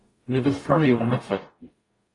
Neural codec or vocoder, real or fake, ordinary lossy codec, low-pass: codec, 44.1 kHz, 0.9 kbps, DAC; fake; AAC, 32 kbps; 10.8 kHz